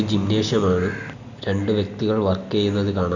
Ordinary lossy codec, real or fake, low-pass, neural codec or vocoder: none; real; 7.2 kHz; none